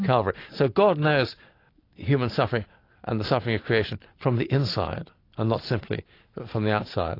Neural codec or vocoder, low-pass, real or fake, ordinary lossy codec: none; 5.4 kHz; real; AAC, 32 kbps